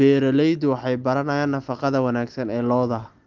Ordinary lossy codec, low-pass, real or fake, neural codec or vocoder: Opus, 32 kbps; 7.2 kHz; real; none